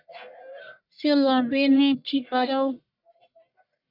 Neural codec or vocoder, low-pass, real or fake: codec, 44.1 kHz, 1.7 kbps, Pupu-Codec; 5.4 kHz; fake